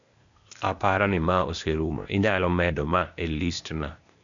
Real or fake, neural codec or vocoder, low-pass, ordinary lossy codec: fake; codec, 16 kHz, 0.8 kbps, ZipCodec; 7.2 kHz; none